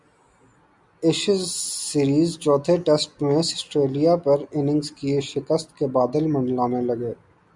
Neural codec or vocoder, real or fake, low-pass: none; real; 10.8 kHz